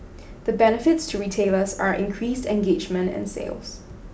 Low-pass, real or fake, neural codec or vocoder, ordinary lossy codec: none; real; none; none